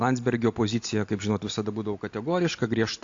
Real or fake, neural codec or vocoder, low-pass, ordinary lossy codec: real; none; 7.2 kHz; AAC, 48 kbps